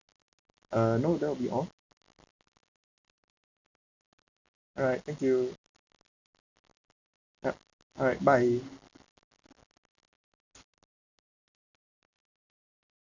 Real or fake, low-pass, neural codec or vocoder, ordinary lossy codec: real; 7.2 kHz; none; none